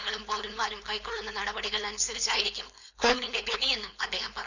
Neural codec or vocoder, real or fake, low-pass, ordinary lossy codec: codec, 16 kHz, 4.8 kbps, FACodec; fake; 7.2 kHz; none